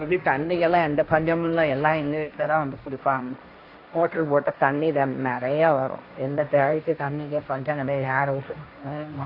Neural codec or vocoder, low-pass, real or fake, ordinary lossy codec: codec, 16 kHz, 1.1 kbps, Voila-Tokenizer; 5.4 kHz; fake; AAC, 32 kbps